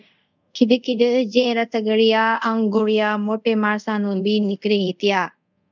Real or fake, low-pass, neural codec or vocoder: fake; 7.2 kHz; codec, 24 kHz, 0.5 kbps, DualCodec